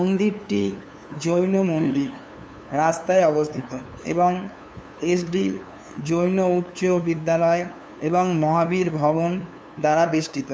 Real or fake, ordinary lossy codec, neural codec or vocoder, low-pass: fake; none; codec, 16 kHz, 2 kbps, FunCodec, trained on LibriTTS, 25 frames a second; none